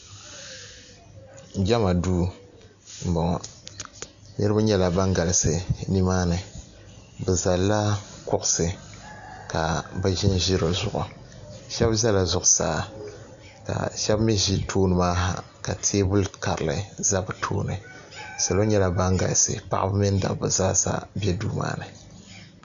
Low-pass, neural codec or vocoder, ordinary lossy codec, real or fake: 7.2 kHz; none; AAC, 96 kbps; real